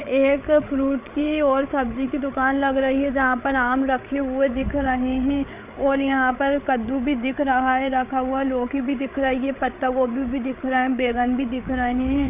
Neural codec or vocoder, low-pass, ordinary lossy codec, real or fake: codec, 16 kHz, 8 kbps, FreqCodec, larger model; 3.6 kHz; none; fake